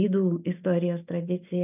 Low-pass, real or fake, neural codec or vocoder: 3.6 kHz; real; none